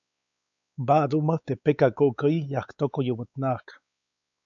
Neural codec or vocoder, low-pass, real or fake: codec, 16 kHz, 4 kbps, X-Codec, WavLM features, trained on Multilingual LibriSpeech; 7.2 kHz; fake